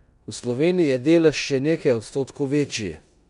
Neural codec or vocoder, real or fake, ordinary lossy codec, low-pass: codec, 16 kHz in and 24 kHz out, 0.9 kbps, LongCat-Audio-Codec, four codebook decoder; fake; none; 10.8 kHz